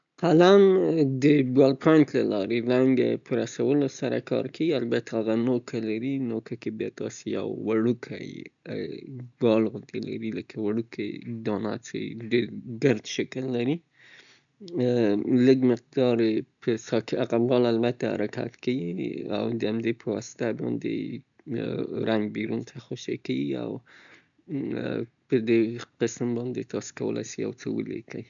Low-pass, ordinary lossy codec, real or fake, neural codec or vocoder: 7.2 kHz; none; real; none